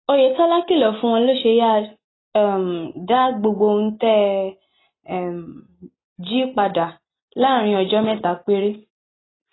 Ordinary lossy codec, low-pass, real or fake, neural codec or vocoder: AAC, 16 kbps; 7.2 kHz; real; none